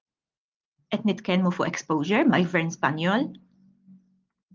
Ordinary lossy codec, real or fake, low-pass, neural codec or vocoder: Opus, 32 kbps; real; 7.2 kHz; none